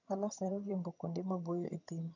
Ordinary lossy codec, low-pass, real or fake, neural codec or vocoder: none; 7.2 kHz; fake; vocoder, 22.05 kHz, 80 mel bands, HiFi-GAN